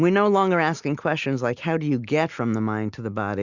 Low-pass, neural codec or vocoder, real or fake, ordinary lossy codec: 7.2 kHz; none; real; Opus, 64 kbps